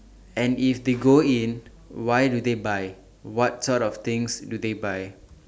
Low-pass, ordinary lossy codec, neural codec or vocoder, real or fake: none; none; none; real